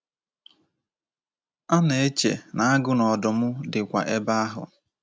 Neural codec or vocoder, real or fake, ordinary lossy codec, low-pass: none; real; none; none